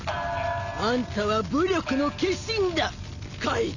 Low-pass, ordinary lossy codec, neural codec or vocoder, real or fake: 7.2 kHz; none; none; real